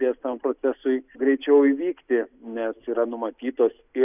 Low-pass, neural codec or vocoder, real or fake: 3.6 kHz; none; real